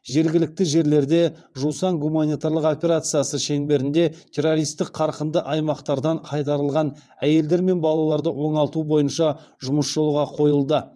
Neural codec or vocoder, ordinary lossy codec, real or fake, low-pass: vocoder, 22.05 kHz, 80 mel bands, WaveNeXt; none; fake; none